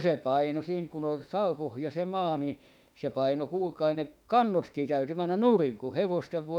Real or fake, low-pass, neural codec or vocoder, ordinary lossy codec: fake; 19.8 kHz; autoencoder, 48 kHz, 32 numbers a frame, DAC-VAE, trained on Japanese speech; none